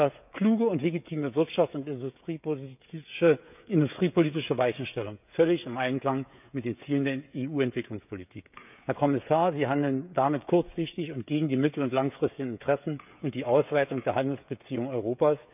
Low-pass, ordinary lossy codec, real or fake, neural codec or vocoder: 3.6 kHz; none; fake; codec, 16 kHz, 16 kbps, FreqCodec, smaller model